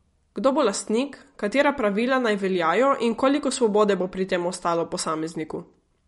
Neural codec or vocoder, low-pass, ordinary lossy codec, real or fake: none; 19.8 kHz; MP3, 48 kbps; real